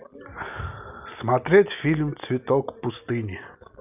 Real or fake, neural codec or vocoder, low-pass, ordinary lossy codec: real; none; 3.6 kHz; none